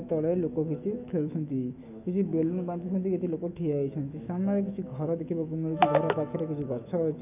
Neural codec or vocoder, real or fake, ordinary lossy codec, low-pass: autoencoder, 48 kHz, 128 numbers a frame, DAC-VAE, trained on Japanese speech; fake; none; 3.6 kHz